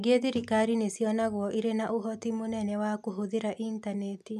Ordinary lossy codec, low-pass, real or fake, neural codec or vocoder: none; 14.4 kHz; real; none